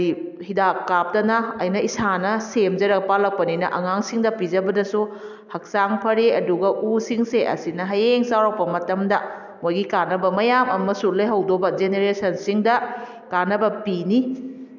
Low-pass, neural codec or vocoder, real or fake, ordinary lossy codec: 7.2 kHz; none; real; none